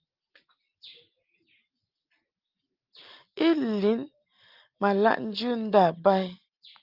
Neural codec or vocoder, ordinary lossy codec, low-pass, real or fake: none; Opus, 32 kbps; 5.4 kHz; real